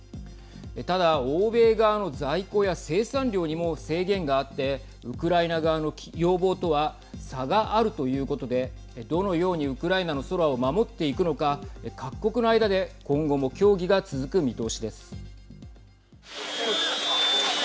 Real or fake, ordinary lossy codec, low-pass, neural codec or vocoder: real; none; none; none